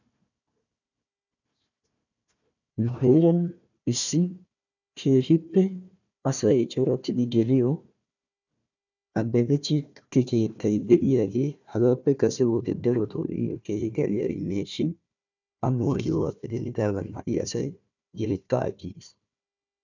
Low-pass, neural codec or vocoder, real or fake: 7.2 kHz; codec, 16 kHz, 1 kbps, FunCodec, trained on Chinese and English, 50 frames a second; fake